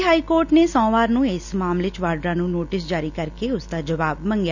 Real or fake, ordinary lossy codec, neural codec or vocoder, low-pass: real; none; none; 7.2 kHz